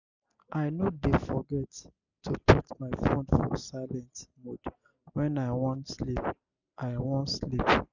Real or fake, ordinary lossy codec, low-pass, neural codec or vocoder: real; none; 7.2 kHz; none